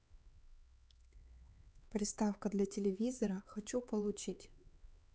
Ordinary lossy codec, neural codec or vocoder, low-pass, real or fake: none; codec, 16 kHz, 4 kbps, X-Codec, HuBERT features, trained on LibriSpeech; none; fake